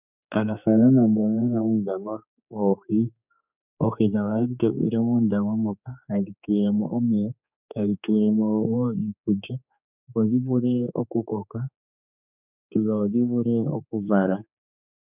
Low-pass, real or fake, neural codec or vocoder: 3.6 kHz; fake; codec, 16 kHz, 4 kbps, X-Codec, HuBERT features, trained on general audio